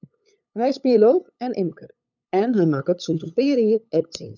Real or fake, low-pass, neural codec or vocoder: fake; 7.2 kHz; codec, 16 kHz, 8 kbps, FunCodec, trained on LibriTTS, 25 frames a second